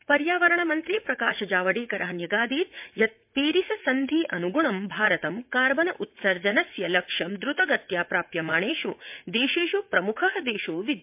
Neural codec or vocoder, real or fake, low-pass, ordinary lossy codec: vocoder, 44.1 kHz, 80 mel bands, Vocos; fake; 3.6 kHz; MP3, 32 kbps